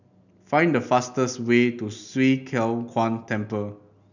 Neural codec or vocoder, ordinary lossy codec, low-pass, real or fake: none; none; 7.2 kHz; real